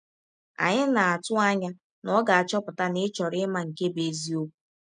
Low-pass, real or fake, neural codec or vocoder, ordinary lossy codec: 9.9 kHz; real; none; none